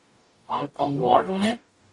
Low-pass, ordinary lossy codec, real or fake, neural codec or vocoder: 10.8 kHz; AAC, 48 kbps; fake; codec, 44.1 kHz, 0.9 kbps, DAC